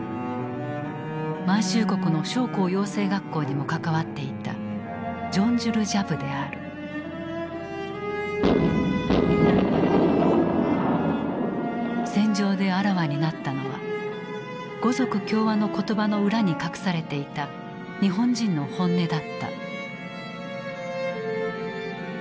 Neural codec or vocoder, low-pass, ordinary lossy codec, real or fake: none; none; none; real